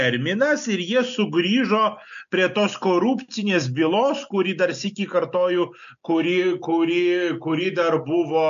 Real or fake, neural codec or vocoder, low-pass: real; none; 7.2 kHz